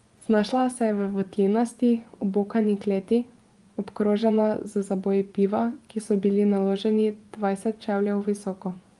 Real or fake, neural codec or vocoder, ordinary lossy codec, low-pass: fake; vocoder, 24 kHz, 100 mel bands, Vocos; Opus, 32 kbps; 10.8 kHz